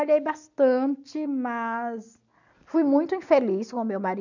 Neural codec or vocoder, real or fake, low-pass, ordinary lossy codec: none; real; 7.2 kHz; none